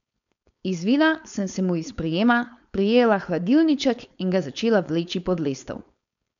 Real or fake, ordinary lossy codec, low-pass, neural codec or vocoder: fake; none; 7.2 kHz; codec, 16 kHz, 4.8 kbps, FACodec